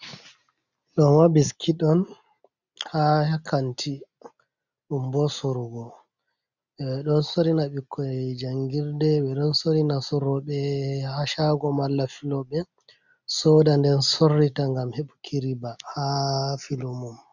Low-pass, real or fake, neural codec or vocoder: 7.2 kHz; real; none